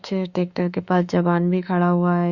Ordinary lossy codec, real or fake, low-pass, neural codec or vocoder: Opus, 64 kbps; fake; 7.2 kHz; autoencoder, 48 kHz, 32 numbers a frame, DAC-VAE, trained on Japanese speech